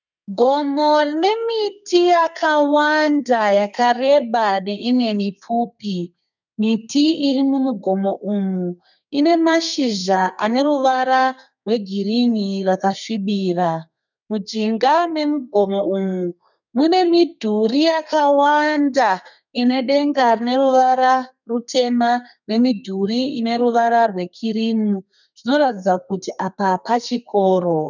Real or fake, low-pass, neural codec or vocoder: fake; 7.2 kHz; codec, 32 kHz, 1.9 kbps, SNAC